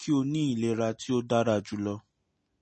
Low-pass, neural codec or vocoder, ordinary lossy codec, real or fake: 10.8 kHz; none; MP3, 32 kbps; real